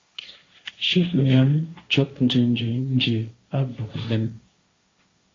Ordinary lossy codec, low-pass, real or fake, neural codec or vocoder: AAC, 32 kbps; 7.2 kHz; fake; codec, 16 kHz, 1.1 kbps, Voila-Tokenizer